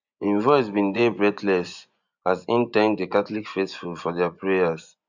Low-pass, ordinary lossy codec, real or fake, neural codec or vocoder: 7.2 kHz; none; fake; vocoder, 24 kHz, 100 mel bands, Vocos